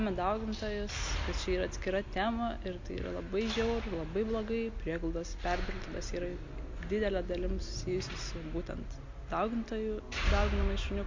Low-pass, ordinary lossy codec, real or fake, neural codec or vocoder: 7.2 kHz; MP3, 48 kbps; real; none